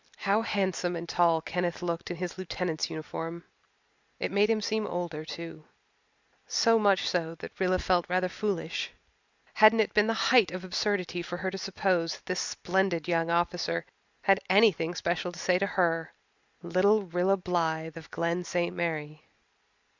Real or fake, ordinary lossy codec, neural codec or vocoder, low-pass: real; Opus, 64 kbps; none; 7.2 kHz